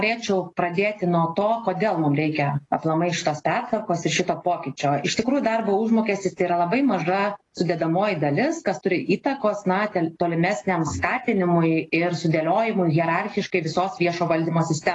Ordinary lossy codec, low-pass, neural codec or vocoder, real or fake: AAC, 32 kbps; 10.8 kHz; none; real